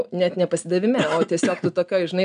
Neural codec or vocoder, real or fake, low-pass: none; real; 9.9 kHz